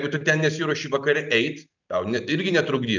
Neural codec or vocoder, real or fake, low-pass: none; real; 7.2 kHz